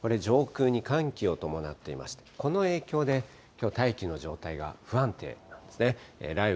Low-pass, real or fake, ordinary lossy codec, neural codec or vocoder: none; real; none; none